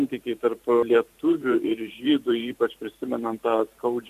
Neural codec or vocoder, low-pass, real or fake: vocoder, 44.1 kHz, 128 mel bands every 512 samples, BigVGAN v2; 14.4 kHz; fake